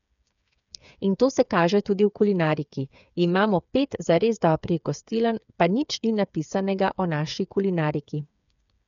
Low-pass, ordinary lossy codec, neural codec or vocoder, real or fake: 7.2 kHz; none; codec, 16 kHz, 8 kbps, FreqCodec, smaller model; fake